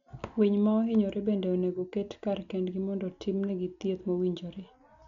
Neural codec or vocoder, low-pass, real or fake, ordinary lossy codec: none; 7.2 kHz; real; none